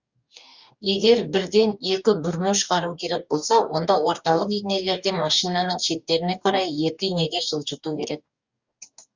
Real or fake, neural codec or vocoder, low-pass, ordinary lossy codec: fake; codec, 44.1 kHz, 2.6 kbps, DAC; 7.2 kHz; Opus, 64 kbps